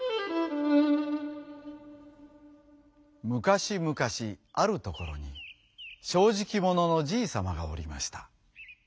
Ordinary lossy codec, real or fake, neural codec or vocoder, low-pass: none; real; none; none